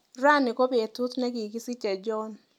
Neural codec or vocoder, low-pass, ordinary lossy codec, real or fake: none; 19.8 kHz; none; real